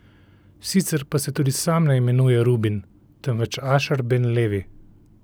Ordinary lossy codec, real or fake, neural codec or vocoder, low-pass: none; real; none; none